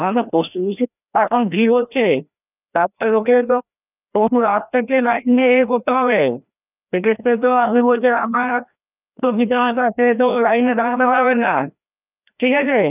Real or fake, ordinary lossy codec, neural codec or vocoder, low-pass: fake; none; codec, 16 kHz, 1 kbps, FreqCodec, larger model; 3.6 kHz